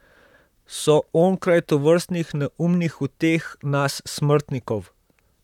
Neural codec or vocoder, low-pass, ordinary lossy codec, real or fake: vocoder, 44.1 kHz, 128 mel bands, Pupu-Vocoder; 19.8 kHz; none; fake